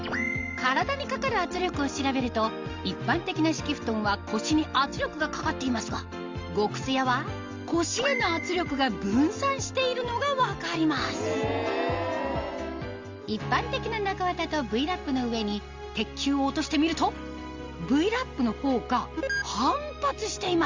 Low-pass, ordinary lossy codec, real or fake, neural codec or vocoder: 7.2 kHz; Opus, 32 kbps; real; none